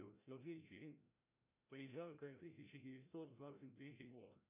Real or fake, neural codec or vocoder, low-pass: fake; codec, 16 kHz, 0.5 kbps, FreqCodec, larger model; 3.6 kHz